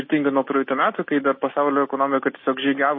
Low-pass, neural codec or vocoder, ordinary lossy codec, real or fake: 7.2 kHz; none; MP3, 24 kbps; real